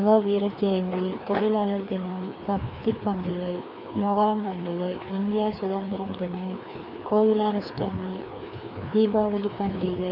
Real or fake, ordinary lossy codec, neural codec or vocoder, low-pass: fake; none; codec, 16 kHz, 2 kbps, FreqCodec, larger model; 5.4 kHz